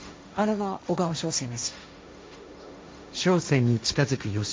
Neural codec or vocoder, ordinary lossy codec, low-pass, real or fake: codec, 16 kHz, 1.1 kbps, Voila-Tokenizer; none; none; fake